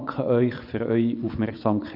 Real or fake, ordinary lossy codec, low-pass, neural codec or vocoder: fake; none; 5.4 kHz; vocoder, 24 kHz, 100 mel bands, Vocos